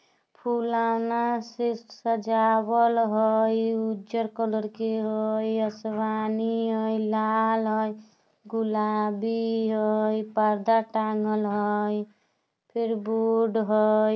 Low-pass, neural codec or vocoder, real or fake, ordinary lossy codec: none; none; real; none